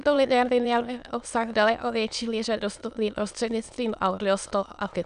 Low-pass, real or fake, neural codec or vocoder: 9.9 kHz; fake; autoencoder, 22.05 kHz, a latent of 192 numbers a frame, VITS, trained on many speakers